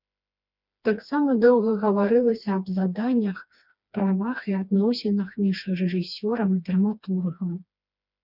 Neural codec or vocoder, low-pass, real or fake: codec, 16 kHz, 2 kbps, FreqCodec, smaller model; 5.4 kHz; fake